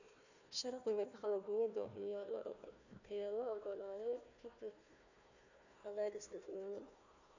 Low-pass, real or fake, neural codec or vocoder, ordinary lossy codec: 7.2 kHz; fake; codec, 16 kHz, 1 kbps, FunCodec, trained on Chinese and English, 50 frames a second; none